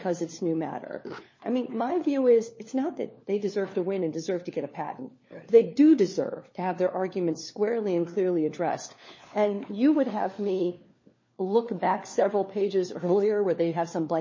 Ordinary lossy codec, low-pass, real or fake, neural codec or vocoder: MP3, 32 kbps; 7.2 kHz; fake; codec, 16 kHz, 4 kbps, FunCodec, trained on LibriTTS, 50 frames a second